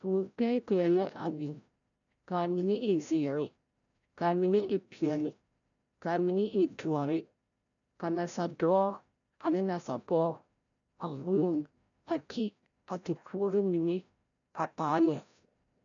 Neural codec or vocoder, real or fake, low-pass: codec, 16 kHz, 0.5 kbps, FreqCodec, larger model; fake; 7.2 kHz